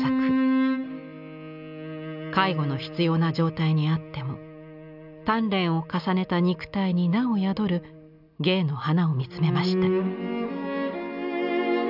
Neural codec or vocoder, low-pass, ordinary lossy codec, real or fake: none; 5.4 kHz; none; real